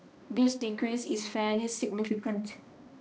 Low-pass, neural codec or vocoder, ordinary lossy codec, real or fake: none; codec, 16 kHz, 1 kbps, X-Codec, HuBERT features, trained on balanced general audio; none; fake